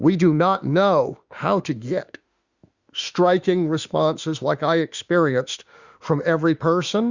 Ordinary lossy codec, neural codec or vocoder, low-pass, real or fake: Opus, 64 kbps; autoencoder, 48 kHz, 32 numbers a frame, DAC-VAE, trained on Japanese speech; 7.2 kHz; fake